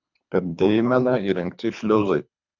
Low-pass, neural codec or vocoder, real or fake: 7.2 kHz; codec, 24 kHz, 3 kbps, HILCodec; fake